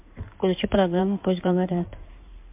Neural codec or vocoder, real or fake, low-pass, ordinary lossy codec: codec, 16 kHz in and 24 kHz out, 2.2 kbps, FireRedTTS-2 codec; fake; 3.6 kHz; MP3, 32 kbps